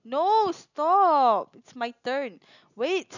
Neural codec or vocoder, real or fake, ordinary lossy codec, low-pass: none; real; none; 7.2 kHz